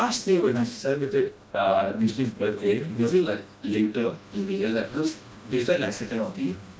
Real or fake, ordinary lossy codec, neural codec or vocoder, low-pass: fake; none; codec, 16 kHz, 1 kbps, FreqCodec, smaller model; none